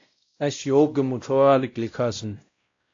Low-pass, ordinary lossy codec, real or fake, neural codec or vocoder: 7.2 kHz; AAC, 48 kbps; fake; codec, 16 kHz, 0.5 kbps, X-Codec, WavLM features, trained on Multilingual LibriSpeech